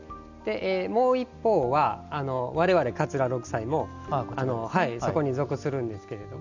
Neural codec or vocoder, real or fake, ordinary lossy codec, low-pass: none; real; none; 7.2 kHz